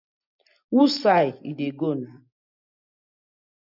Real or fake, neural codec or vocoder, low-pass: real; none; 5.4 kHz